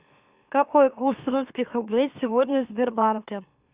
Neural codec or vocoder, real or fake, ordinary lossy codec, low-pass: autoencoder, 44.1 kHz, a latent of 192 numbers a frame, MeloTTS; fake; Opus, 64 kbps; 3.6 kHz